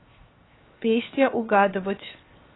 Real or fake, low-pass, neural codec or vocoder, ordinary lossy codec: fake; 7.2 kHz; codec, 16 kHz, 0.7 kbps, FocalCodec; AAC, 16 kbps